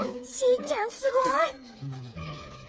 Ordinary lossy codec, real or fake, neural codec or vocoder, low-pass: none; fake; codec, 16 kHz, 4 kbps, FreqCodec, smaller model; none